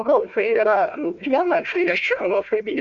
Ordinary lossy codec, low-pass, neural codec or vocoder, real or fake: Opus, 64 kbps; 7.2 kHz; codec, 16 kHz, 1 kbps, FunCodec, trained on Chinese and English, 50 frames a second; fake